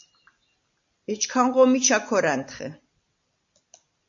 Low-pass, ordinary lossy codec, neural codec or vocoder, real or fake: 7.2 kHz; AAC, 64 kbps; none; real